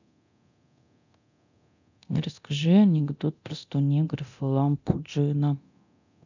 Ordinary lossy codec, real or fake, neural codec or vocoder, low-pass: none; fake; codec, 24 kHz, 0.9 kbps, DualCodec; 7.2 kHz